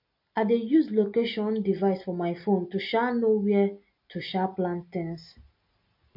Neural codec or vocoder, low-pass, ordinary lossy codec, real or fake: none; 5.4 kHz; MP3, 32 kbps; real